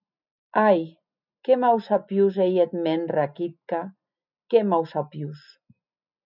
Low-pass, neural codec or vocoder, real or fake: 5.4 kHz; none; real